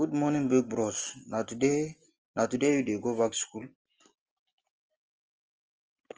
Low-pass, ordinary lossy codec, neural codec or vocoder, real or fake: 7.2 kHz; Opus, 24 kbps; none; real